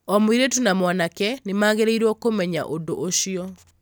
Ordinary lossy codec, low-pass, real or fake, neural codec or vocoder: none; none; real; none